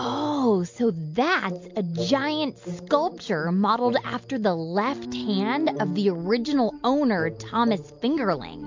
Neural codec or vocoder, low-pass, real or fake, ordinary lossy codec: none; 7.2 kHz; real; MP3, 48 kbps